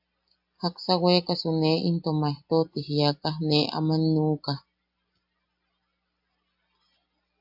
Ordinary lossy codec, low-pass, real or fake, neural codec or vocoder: AAC, 48 kbps; 5.4 kHz; real; none